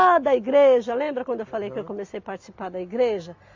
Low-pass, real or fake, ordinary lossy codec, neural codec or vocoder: 7.2 kHz; real; AAC, 48 kbps; none